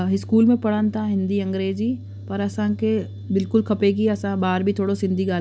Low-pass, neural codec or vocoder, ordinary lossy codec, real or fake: none; none; none; real